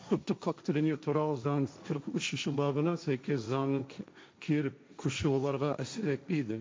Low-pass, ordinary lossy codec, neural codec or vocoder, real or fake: none; none; codec, 16 kHz, 1.1 kbps, Voila-Tokenizer; fake